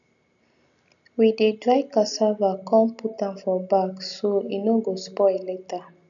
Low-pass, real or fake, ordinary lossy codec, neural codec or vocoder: 7.2 kHz; real; none; none